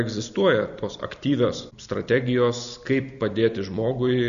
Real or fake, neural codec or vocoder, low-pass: real; none; 7.2 kHz